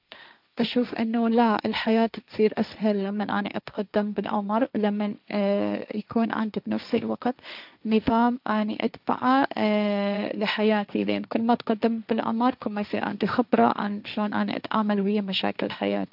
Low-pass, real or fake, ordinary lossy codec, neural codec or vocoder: 5.4 kHz; fake; none; codec, 16 kHz, 1.1 kbps, Voila-Tokenizer